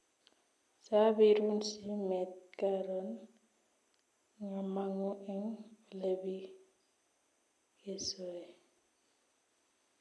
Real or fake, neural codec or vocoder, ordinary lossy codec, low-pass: real; none; none; none